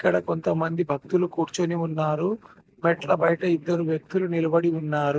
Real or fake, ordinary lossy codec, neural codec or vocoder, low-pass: real; none; none; none